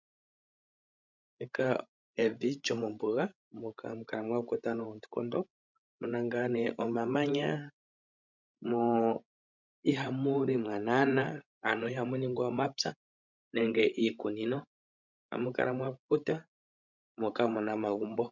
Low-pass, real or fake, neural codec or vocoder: 7.2 kHz; fake; codec, 16 kHz, 16 kbps, FreqCodec, larger model